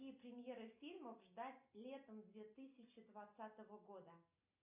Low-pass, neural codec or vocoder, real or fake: 3.6 kHz; none; real